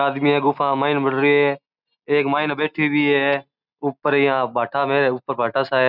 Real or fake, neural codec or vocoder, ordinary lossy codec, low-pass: real; none; none; 5.4 kHz